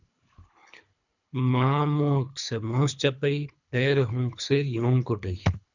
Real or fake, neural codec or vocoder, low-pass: fake; codec, 24 kHz, 3 kbps, HILCodec; 7.2 kHz